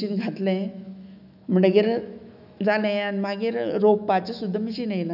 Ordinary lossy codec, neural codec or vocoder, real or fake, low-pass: none; autoencoder, 48 kHz, 128 numbers a frame, DAC-VAE, trained on Japanese speech; fake; 5.4 kHz